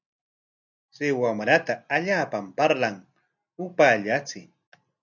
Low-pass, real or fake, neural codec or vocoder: 7.2 kHz; real; none